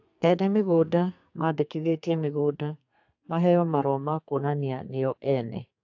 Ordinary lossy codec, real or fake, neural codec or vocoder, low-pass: AAC, 48 kbps; fake; codec, 32 kHz, 1.9 kbps, SNAC; 7.2 kHz